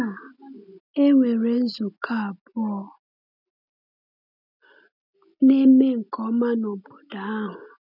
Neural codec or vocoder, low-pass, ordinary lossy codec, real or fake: none; 5.4 kHz; none; real